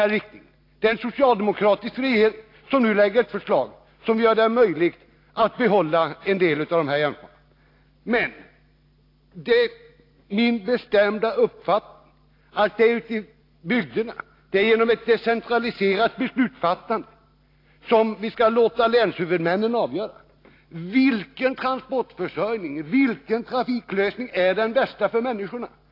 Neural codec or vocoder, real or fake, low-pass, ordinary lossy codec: none; real; 5.4 kHz; AAC, 32 kbps